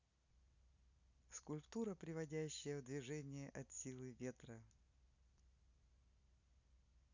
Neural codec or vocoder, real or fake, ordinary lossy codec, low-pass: none; real; none; 7.2 kHz